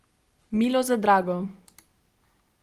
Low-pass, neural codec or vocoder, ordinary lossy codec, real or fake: 14.4 kHz; none; Opus, 24 kbps; real